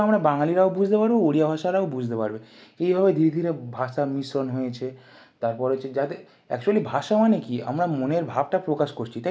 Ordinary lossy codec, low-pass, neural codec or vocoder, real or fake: none; none; none; real